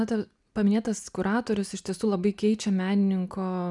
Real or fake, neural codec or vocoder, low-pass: real; none; 10.8 kHz